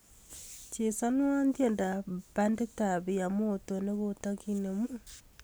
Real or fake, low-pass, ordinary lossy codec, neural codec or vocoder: real; none; none; none